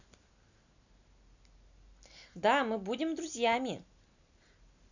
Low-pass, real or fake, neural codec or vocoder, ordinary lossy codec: 7.2 kHz; real; none; none